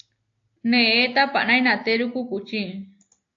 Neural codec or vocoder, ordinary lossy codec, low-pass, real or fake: none; AAC, 48 kbps; 7.2 kHz; real